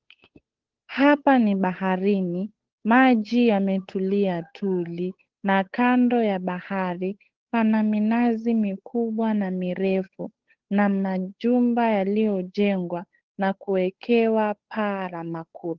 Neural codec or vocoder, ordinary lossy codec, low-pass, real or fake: codec, 16 kHz, 8 kbps, FunCodec, trained on Chinese and English, 25 frames a second; Opus, 16 kbps; 7.2 kHz; fake